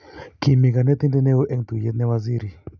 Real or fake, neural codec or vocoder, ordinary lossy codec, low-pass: real; none; none; 7.2 kHz